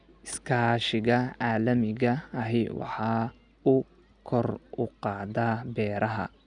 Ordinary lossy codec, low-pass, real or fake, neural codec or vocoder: none; 9.9 kHz; real; none